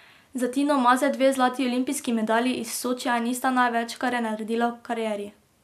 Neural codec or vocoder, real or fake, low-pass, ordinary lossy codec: none; real; 14.4 kHz; MP3, 96 kbps